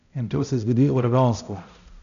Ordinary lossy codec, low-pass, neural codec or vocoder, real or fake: none; 7.2 kHz; codec, 16 kHz, 0.5 kbps, X-Codec, HuBERT features, trained on balanced general audio; fake